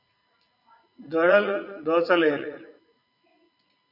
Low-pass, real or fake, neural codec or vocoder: 5.4 kHz; fake; vocoder, 44.1 kHz, 80 mel bands, Vocos